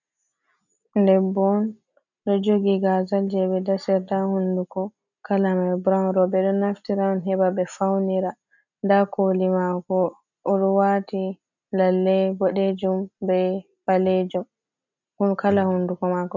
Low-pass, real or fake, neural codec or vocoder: 7.2 kHz; real; none